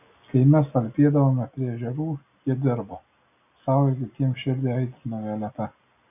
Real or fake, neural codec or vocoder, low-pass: real; none; 3.6 kHz